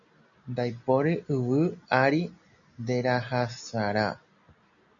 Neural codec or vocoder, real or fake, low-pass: none; real; 7.2 kHz